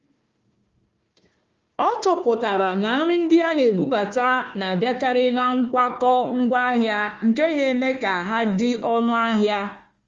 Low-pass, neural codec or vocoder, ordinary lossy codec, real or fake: 7.2 kHz; codec, 16 kHz, 1 kbps, FunCodec, trained on Chinese and English, 50 frames a second; Opus, 32 kbps; fake